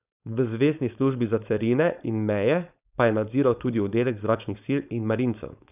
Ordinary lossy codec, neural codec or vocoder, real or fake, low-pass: none; codec, 16 kHz, 4.8 kbps, FACodec; fake; 3.6 kHz